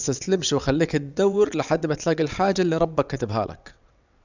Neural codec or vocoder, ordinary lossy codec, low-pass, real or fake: vocoder, 22.05 kHz, 80 mel bands, WaveNeXt; none; 7.2 kHz; fake